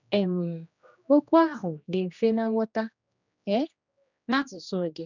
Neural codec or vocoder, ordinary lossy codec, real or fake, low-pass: codec, 16 kHz, 1 kbps, X-Codec, HuBERT features, trained on general audio; none; fake; 7.2 kHz